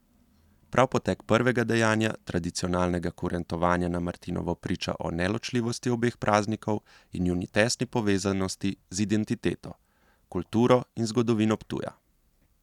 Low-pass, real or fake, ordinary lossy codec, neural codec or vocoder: 19.8 kHz; real; none; none